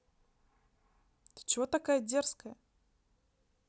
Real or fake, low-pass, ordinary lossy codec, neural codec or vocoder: real; none; none; none